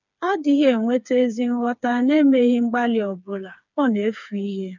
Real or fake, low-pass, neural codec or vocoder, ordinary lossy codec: fake; 7.2 kHz; codec, 16 kHz, 4 kbps, FreqCodec, smaller model; none